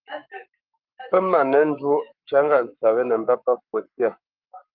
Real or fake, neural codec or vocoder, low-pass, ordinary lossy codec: fake; codec, 44.1 kHz, 7.8 kbps, Pupu-Codec; 5.4 kHz; Opus, 24 kbps